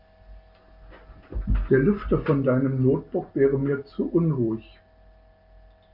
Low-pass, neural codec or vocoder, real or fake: 5.4 kHz; none; real